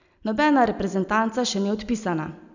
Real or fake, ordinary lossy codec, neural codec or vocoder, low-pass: real; none; none; 7.2 kHz